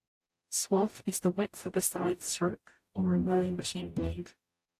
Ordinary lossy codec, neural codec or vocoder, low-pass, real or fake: AAC, 64 kbps; codec, 44.1 kHz, 0.9 kbps, DAC; 14.4 kHz; fake